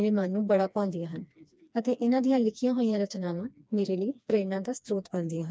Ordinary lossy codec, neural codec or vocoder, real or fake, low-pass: none; codec, 16 kHz, 2 kbps, FreqCodec, smaller model; fake; none